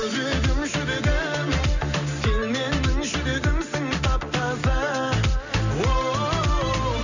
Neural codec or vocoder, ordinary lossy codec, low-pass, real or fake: none; none; 7.2 kHz; real